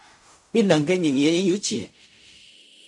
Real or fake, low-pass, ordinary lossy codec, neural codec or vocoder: fake; 10.8 kHz; AAC, 64 kbps; codec, 16 kHz in and 24 kHz out, 0.4 kbps, LongCat-Audio-Codec, fine tuned four codebook decoder